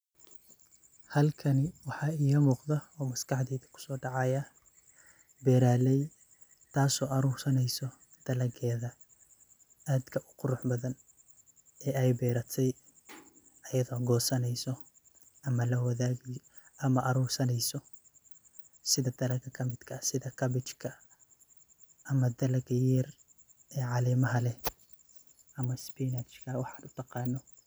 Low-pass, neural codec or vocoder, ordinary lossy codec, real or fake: none; none; none; real